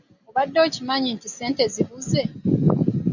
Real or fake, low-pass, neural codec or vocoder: real; 7.2 kHz; none